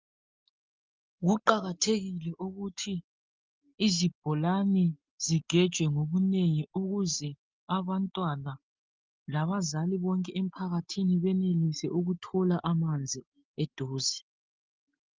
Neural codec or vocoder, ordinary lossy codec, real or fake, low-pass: none; Opus, 24 kbps; real; 7.2 kHz